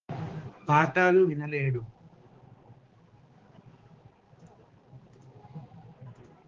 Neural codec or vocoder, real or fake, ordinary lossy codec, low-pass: codec, 16 kHz, 2 kbps, X-Codec, HuBERT features, trained on balanced general audio; fake; Opus, 16 kbps; 7.2 kHz